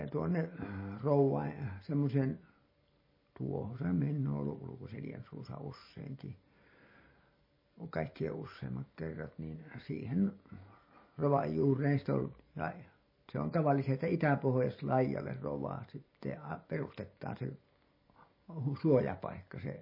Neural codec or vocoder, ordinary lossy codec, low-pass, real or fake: none; MP3, 24 kbps; 5.4 kHz; real